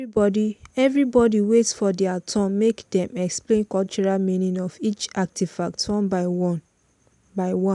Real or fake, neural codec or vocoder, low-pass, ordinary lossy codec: real; none; 10.8 kHz; none